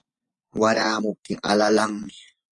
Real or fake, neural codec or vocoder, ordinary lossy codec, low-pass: fake; vocoder, 24 kHz, 100 mel bands, Vocos; AAC, 48 kbps; 10.8 kHz